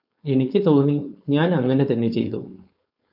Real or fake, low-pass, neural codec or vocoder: fake; 5.4 kHz; codec, 16 kHz, 4.8 kbps, FACodec